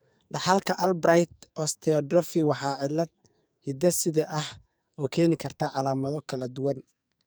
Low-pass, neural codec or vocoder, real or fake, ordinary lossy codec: none; codec, 44.1 kHz, 2.6 kbps, SNAC; fake; none